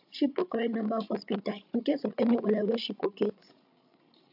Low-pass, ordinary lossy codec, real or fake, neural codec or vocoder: 5.4 kHz; AAC, 48 kbps; fake; codec, 16 kHz, 16 kbps, FreqCodec, larger model